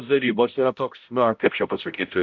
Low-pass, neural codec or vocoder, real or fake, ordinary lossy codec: 7.2 kHz; codec, 16 kHz, 0.5 kbps, X-Codec, HuBERT features, trained on balanced general audio; fake; MP3, 64 kbps